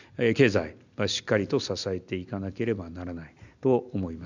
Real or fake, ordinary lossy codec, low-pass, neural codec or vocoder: real; none; 7.2 kHz; none